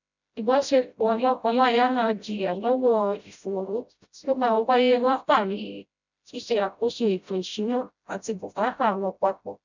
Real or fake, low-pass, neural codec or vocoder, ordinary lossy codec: fake; 7.2 kHz; codec, 16 kHz, 0.5 kbps, FreqCodec, smaller model; none